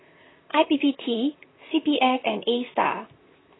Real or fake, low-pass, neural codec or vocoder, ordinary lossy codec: fake; 7.2 kHz; vocoder, 44.1 kHz, 128 mel bands, Pupu-Vocoder; AAC, 16 kbps